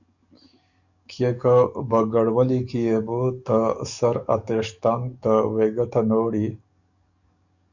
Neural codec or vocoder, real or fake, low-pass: codec, 44.1 kHz, 7.8 kbps, DAC; fake; 7.2 kHz